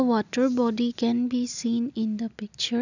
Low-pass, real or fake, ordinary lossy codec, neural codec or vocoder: 7.2 kHz; real; none; none